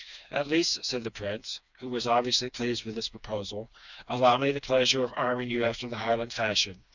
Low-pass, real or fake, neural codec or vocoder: 7.2 kHz; fake; codec, 16 kHz, 2 kbps, FreqCodec, smaller model